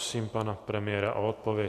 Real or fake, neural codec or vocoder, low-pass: real; none; 14.4 kHz